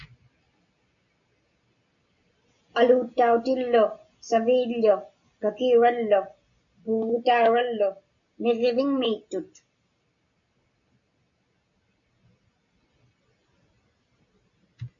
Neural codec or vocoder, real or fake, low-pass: none; real; 7.2 kHz